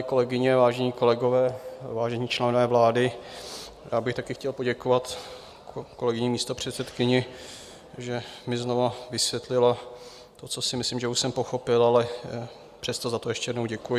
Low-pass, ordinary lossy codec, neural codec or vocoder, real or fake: 14.4 kHz; Opus, 64 kbps; none; real